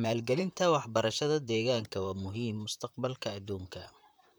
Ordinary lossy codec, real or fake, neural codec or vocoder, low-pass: none; fake; vocoder, 44.1 kHz, 128 mel bands, Pupu-Vocoder; none